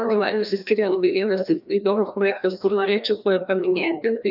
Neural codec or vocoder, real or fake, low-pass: codec, 16 kHz, 1 kbps, FreqCodec, larger model; fake; 5.4 kHz